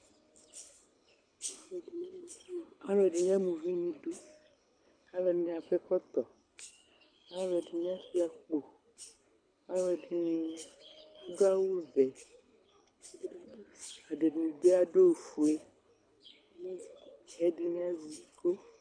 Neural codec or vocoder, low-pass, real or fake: codec, 24 kHz, 6 kbps, HILCodec; 9.9 kHz; fake